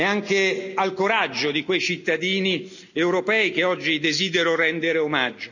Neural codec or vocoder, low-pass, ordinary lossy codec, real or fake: none; 7.2 kHz; none; real